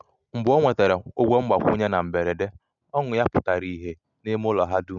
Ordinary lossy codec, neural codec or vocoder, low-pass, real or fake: none; none; 7.2 kHz; real